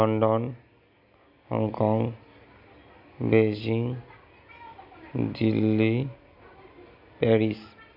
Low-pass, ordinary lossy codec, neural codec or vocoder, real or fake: 5.4 kHz; none; none; real